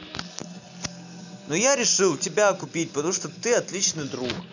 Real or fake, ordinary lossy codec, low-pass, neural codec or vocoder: real; none; 7.2 kHz; none